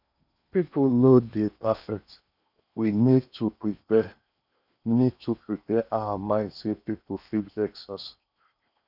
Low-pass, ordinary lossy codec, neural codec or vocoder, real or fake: 5.4 kHz; none; codec, 16 kHz in and 24 kHz out, 0.8 kbps, FocalCodec, streaming, 65536 codes; fake